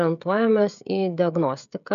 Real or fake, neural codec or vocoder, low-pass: fake; codec, 16 kHz, 16 kbps, FreqCodec, smaller model; 7.2 kHz